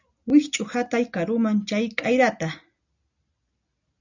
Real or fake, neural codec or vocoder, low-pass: real; none; 7.2 kHz